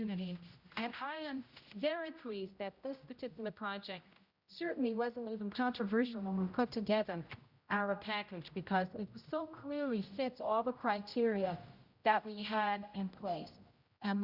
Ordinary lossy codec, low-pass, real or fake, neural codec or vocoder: Opus, 64 kbps; 5.4 kHz; fake; codec, 16 kHz, 0.5 kbps, X-Codec, HuBERT features, trained on general audio